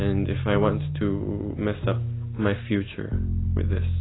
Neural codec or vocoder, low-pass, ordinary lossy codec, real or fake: none; 7.2 kHz; AAC, 16 kbps; real